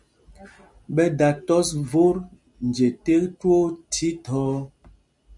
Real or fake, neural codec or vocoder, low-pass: real; none; 10.8 kHz